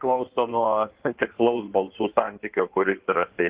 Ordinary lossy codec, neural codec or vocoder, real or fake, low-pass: Opus, 16 kbps; codec, 16 kHz in and 24 kHz out, 1.1 kbps, FireRedTTS-2 codec; fake; 3.6 kHz